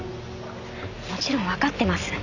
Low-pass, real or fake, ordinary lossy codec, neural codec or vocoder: 7.2 kHz; real; none; none